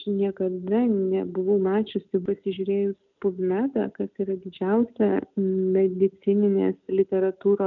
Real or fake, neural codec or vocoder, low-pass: real; none; 7.2 kHz